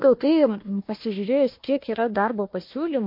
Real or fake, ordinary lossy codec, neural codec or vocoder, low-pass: fake; MP3, 32 kbps; codec, 16 kHz, 1 kbps, FunCodec, trained on Chinese and English, 50 frames a second; 5.4 kHz